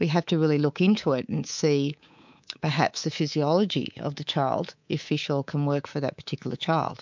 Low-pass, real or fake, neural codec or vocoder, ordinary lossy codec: 7.2 kHz; fake; codec, 24 kHz, 3.1 kbps, DualCodec; MP3, 64 kbps